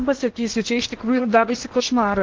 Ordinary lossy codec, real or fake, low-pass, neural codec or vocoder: Opus, 16 kbps; fake; 7.2 kHz; codec, 16 kHz in and 24 kHz out, 0.8 kbps, FocalCodec, streaming, 65536 codes